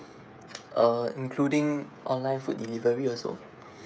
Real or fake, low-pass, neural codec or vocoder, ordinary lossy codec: fake; none; codec, 16 kHz, 16 kbps, FreqCodec, smaller model; none